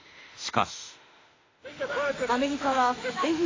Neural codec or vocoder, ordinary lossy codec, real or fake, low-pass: autoencoder, 48 kHz, 32 numbers a frame, DAC-VAE, trained on Japanese speech; AAC, 32 kbps; fake; 7.2 kHz